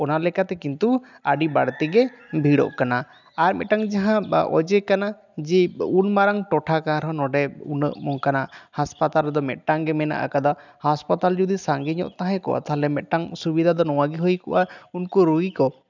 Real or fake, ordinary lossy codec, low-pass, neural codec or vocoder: real; none; 7.2 kHz; none